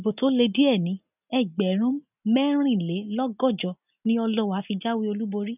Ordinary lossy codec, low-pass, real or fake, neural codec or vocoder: none; 3.6 kHz; real; none